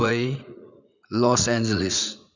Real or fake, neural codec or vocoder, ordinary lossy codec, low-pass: fake; vocoder, 44.1 kHz, 80 mel bands, Vocos; none; 7.2 kHz